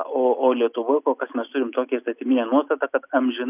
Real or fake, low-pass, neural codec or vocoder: real; 3.6 kHz; none